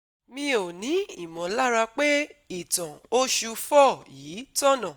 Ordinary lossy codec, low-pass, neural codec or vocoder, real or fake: none; none; none; real